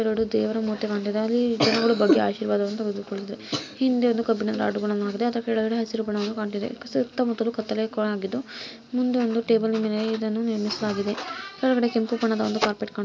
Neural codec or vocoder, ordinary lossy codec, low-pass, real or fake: none; none; none; real